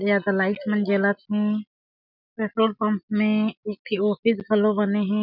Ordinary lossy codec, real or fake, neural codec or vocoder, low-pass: none; real; none; 5.4 kHz